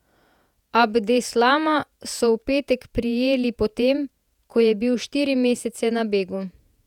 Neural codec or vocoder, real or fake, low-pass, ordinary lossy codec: vocoder, 48 kHz, 128 mel bands, Vocos; fake; 19.8 kHz; none